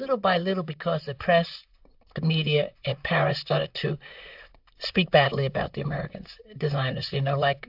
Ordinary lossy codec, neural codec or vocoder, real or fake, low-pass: AAC, 48 kbps; vocoder, 44.1 kHz, 128 mel bands, Pupu-Vocoder; fake; 5.4 kHz